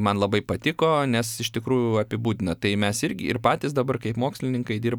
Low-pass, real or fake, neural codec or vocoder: 19.8 kHz; real; none